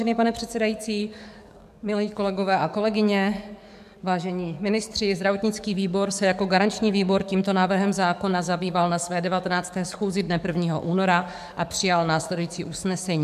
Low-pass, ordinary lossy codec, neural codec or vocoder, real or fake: 14.4 kHz; MP3, 96 kbps; codec, 44.1 kHz, 7.8 kbps, DAC; fake